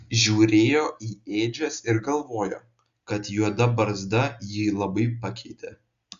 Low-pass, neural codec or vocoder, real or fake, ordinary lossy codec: 7.2 kHz; none; real; Opus, 64 kbps